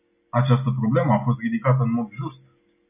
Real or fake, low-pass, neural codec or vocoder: real; 3.6 kHz; none